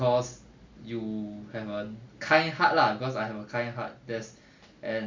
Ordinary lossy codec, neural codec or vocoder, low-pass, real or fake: MP3, 48 kbps; none; 7.2 kHz; real